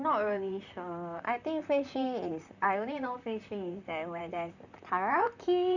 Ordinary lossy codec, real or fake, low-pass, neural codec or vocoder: none; fake; 7.2 kHz; vocoder, 44.1 kHz, 128 mel bands, Pupu-Vocoder